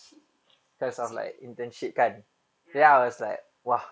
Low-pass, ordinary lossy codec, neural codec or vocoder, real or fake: none; none; none; real